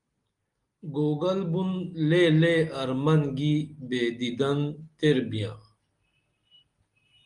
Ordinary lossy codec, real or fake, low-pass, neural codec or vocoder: Opus, 32 kbps; real; 10.8 kHz; none